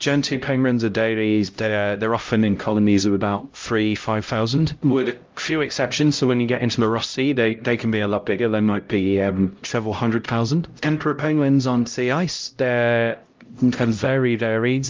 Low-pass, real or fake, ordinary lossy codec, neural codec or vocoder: 7.2 kHz; fake; Opus, 24 kbps; codec, 16 kHz, 0.5 kbps, X-Codec, HuBERT features, trained on LibriSpeech